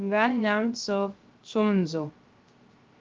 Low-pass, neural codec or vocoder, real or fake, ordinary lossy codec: 7.2 kHz; codec, 16 kHz, 0.3 kbps, FocalCodec; fake; Opus, 32 kbps